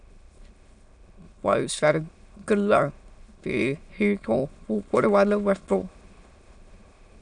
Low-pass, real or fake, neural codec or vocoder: 9.9 kHz; fake; autoencoder, 22.05 kHz, a latent of 192 numbers a frame, VITS, trained on many speakers